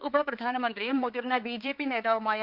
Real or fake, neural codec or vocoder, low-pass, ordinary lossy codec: fake; codec, 16 kHz, 4 kbps, X-Codec, HuBERT features, trained on balanced general audio; 5.4 kHz; Opus, 32 kbps